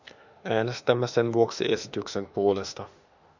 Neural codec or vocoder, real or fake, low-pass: autoencoder, 48 kHz, 32 numbers a frame, DAC-VAE, trained on Japanese speech; fake; 7.2 kHz